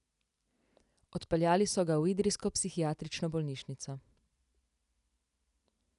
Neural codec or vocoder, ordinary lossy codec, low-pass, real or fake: none; none; 10.8 kHz; real